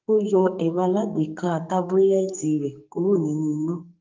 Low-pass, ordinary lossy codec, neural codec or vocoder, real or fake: 7.2 kHz; Opus, 24 kbps; codec, 44.1 kHz, 2.6 kbps, SNAC; fake